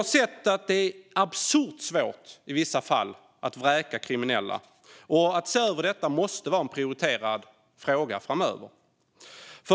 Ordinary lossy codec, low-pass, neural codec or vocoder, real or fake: none; none; none; real